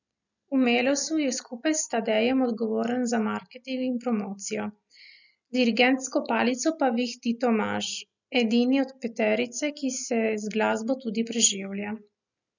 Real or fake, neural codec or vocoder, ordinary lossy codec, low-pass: real; none; none; 7.2 kHz